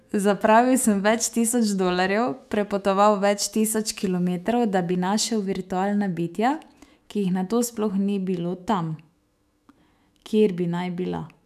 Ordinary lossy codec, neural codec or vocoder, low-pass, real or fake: none; autoencoder, 48 kHz, 128 numbers a frame, DAC-VAE, trained on Japanese speech; 14.4 kHz; fake